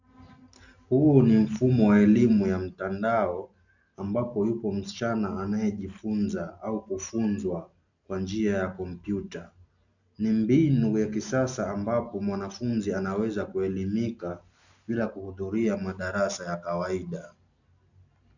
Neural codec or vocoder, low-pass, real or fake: none; 7.2 kHz; real